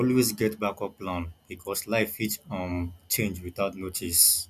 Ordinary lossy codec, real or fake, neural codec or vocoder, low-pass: none; real; none; 14.4 kHz